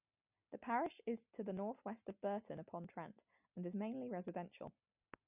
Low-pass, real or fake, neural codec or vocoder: 3.6 kHz; real; none